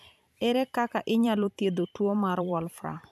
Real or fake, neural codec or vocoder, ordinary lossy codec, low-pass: fake; vocoder, 44.1 kHz, 128 mel bands every 512 samples, BigVGAN v2; none; 14.4 kHz